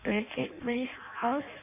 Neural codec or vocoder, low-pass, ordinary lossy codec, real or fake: codec, 16 kHz in and 24 kHz out, 0.6 kbps, FireRedTTS-2 codec; 3.6 kHz; none; fake